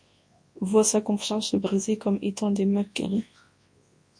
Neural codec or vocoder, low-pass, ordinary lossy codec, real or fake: codec, 24 kHz, 0.9 kbps, WavTokenizer, large speech release; 9.9 kHz; MP3, 48 kbps; fake